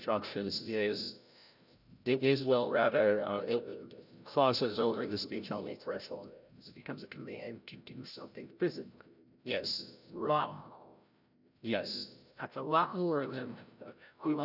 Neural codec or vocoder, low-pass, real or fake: codec, 16 kHz, 0.5 kbps, FreqCodec, larger model; 5.4 kHz; fake